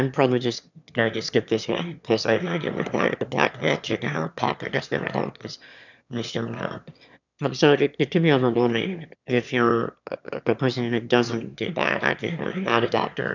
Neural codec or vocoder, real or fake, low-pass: autoencoder, 22.05 kHz, a latent of 192 numbers a frame, VITS, trained on one speaker; fake; 7.2 kHz